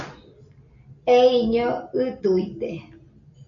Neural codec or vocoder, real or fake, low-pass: none; real; 7.2 kHz